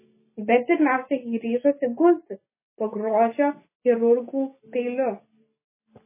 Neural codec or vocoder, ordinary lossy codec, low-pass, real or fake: none; MP3, 16 kbps; 3.6 kHz; real